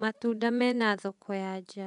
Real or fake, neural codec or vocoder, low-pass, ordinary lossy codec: fake; vocoder, 24 kHz, 100 mel bands, Vocos; 10.8 kHz; none